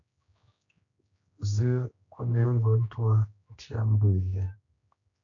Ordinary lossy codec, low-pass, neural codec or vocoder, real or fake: AAC, 64 kbps; 7.2 kHz; codec, 16 kHz, 1 kbps, X-Codec, HuBERT features, trained on general audio; fake